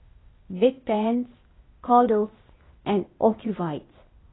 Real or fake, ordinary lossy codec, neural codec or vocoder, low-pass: fake; AAC, 16 kbps; codec, 16 kHz, 0.8 kbps, ZipCodec; 7.2 kHz